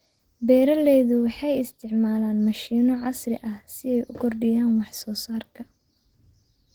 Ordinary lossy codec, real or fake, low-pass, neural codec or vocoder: Opus, 16 kbps; real; 19.8 kHz; none